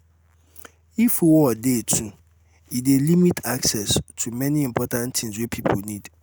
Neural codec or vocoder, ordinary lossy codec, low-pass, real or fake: none; none; none; real